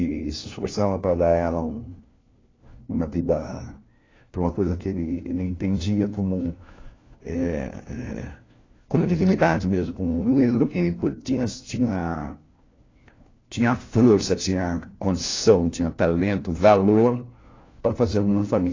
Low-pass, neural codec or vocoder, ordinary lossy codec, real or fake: 7.2 kHz; codec, 16 kHz, 1 kbps, FunCodec, trained on LibriTTS, 50 frames a second; AAC, 32 kbps; fake